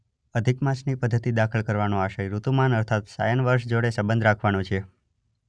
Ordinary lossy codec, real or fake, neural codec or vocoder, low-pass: none; real; none; 9.9 kHz